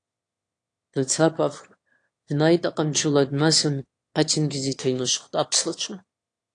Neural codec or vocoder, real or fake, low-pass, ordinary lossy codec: autoencoder, 22.05 kHz, a latent of 192 numbers a frame, VITS, trained on one speaker; fake; 9.9 kHz; AAC, 48 kbps